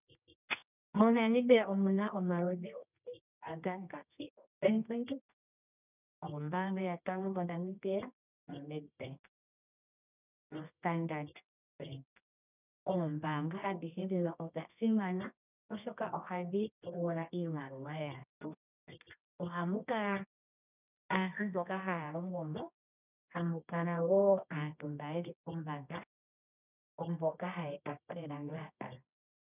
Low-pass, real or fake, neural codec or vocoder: 3.6 kHz; fake; codec, 24 kHz, 0.9 kbps, WavTokenizer, medium music audio release